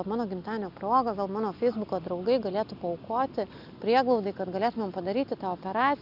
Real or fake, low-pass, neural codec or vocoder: real; 5.4 kHz; none